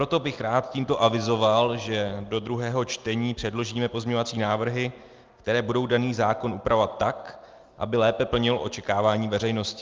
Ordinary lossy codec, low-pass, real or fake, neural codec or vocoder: Opus, 24 kbps; 7.2 kHz; real; none